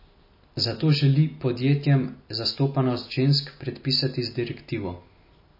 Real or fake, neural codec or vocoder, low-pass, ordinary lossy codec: real; none; 5.4 kHz; MP3, 24 kbps